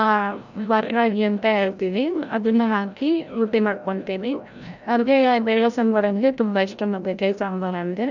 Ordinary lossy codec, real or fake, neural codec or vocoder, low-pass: none; fake; codec, 16 kHz, 0.5 kbps, FreqCodec, larger model; 7.2 kHz